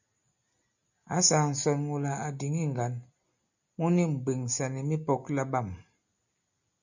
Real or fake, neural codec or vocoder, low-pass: real; none; 7.2 kHz